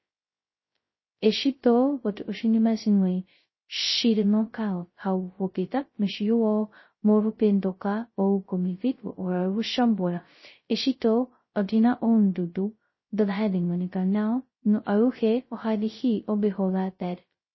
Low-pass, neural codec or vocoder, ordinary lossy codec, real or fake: 7.2 kHz; codec, 16 kHz, 0.2 kbps, FocalCodec; MP3, 24 kbps; fake